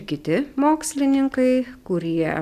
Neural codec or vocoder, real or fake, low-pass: none; real; 14.4 kHz